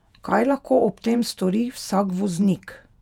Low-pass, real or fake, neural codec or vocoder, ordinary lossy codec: 19.8 kHz; fake; vocoder, 48 kHz, 128 mel bands, Vocos; none